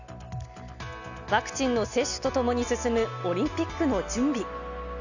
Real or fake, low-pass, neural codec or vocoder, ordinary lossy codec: real; 7.2 kHz; none; none